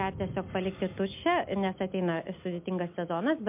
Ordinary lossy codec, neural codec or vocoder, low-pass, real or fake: MP3, 32 kbps; none; 3.6 kHz; real